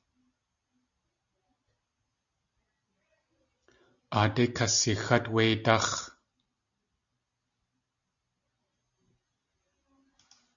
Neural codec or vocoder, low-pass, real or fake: none; 7.2 kHz; real